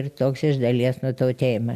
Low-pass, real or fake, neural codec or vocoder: 14.4 kHz; real; none